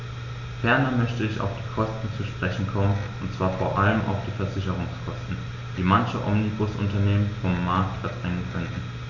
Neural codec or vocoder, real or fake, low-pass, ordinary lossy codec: none; real; 7.2 kHz; none